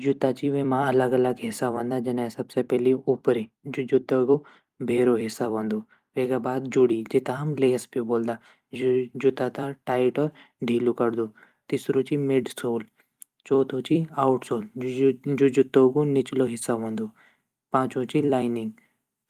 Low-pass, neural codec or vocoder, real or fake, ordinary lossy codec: 14.4 kHz; vocoder, 44.1 kHz, 128 mel bands every 256 samples, BigVGAN v2; fake; Opus, 32 kbps